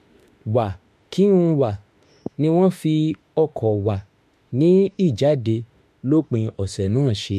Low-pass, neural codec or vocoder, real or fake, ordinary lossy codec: 14.4 kHz; autoencoder, 48 kHz, 32 numbers a frame, DAC-VAE, trained on Japanese speech; fake; MP3, 64 kbps